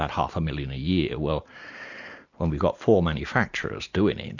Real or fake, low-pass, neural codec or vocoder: real; 7.2 kHz; none